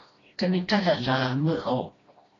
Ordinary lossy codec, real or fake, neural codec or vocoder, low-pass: AAC, 32 kbps; fake; codec, 16 kHz, 1 kbps, FreqCodec, smaller model; 7.2 kHz